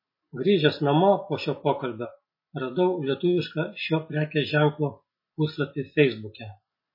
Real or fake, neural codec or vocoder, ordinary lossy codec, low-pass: real; none; MP3, 24 kbps; 5.4 kHz